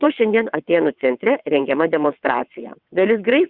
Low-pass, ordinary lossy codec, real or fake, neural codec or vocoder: 5.4 kHz; Opus, 64 kbps; fake; vocoder, 22.05 kHz, 80 mel bands, WaveNeXt